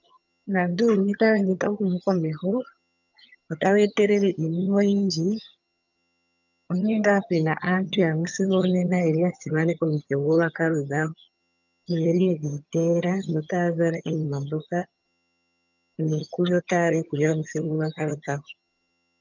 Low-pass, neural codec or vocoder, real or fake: 7.2 kHz; vocoder, 22.05 kHz, 80 mel bands, HiFi-GAN; fake